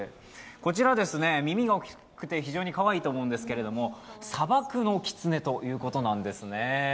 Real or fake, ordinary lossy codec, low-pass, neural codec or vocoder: real; none; none; none